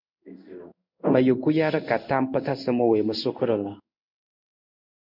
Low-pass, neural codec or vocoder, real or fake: 5.4 kHz; codec, 16 kHz in and 24 kHz out, 1 kbps, XY-Tokenizer; fake